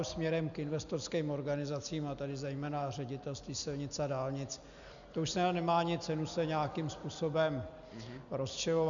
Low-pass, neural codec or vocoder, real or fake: 7.2 kHz; none; real